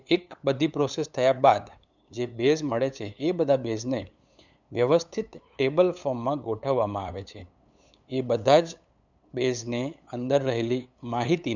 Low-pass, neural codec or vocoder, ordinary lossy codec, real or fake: 7.2 kHz; codec, 16 kHz, 8 kbps, FunCodec, trained on LibriTTS, 25 frames a second; none; fake